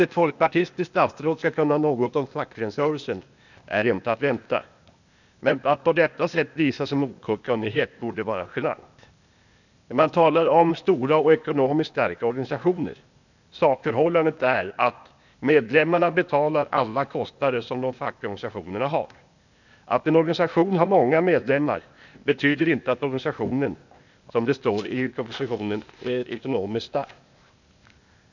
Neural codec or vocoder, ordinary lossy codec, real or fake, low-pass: codec, 16 kHz, 0.8 kbps, ZipCodec; none; fake; 7.2 kHz